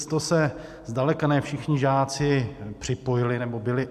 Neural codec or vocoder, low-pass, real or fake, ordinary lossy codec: none; 14.4 kHz; real; MP3, 96 kbps